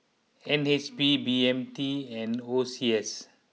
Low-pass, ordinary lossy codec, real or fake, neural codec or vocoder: none; none; real; none